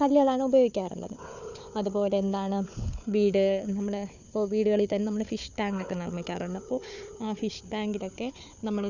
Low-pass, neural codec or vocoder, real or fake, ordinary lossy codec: 7.2 kHz; codec, 16 kHz, 4 kbps, FunCodec, trained on Chinese and English, 50 frames a second; fake; none